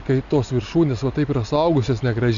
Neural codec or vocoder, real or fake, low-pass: none; real; 7.2 kHz